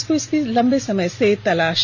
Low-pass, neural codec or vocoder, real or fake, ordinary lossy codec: 7.2 kHz; none; real; MP3, 48 kbps